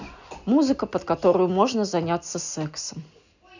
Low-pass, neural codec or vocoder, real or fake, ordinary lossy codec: 7.2 kHz; none; real; none